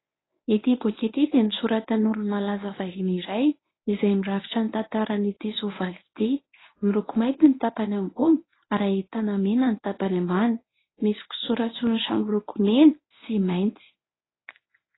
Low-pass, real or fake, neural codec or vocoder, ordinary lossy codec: 7.2 kHz; fake; codec, 24 kHz, 0.9 kbps, WavTokenizer, medium speech release version 1; AAC, 16 kbps